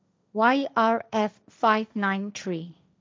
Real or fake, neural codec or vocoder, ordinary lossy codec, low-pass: fake; codec, 16 kHz, 1.1 kbps, Voila-Tokenizer; none; none